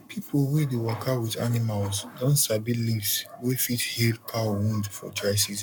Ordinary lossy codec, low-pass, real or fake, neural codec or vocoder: none; 19.8 kHz; fake; codec, 44.1 kHz, 7.8 kbps, Pupu-Codec